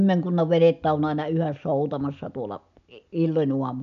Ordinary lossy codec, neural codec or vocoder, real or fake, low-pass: none; none; real; 7.2 kHz